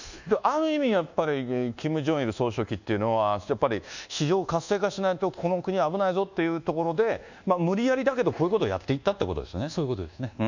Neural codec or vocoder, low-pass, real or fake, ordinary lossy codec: codec, 24 kHz, 1.2 kbps, DualCodec; 7.2 kHz; fake; none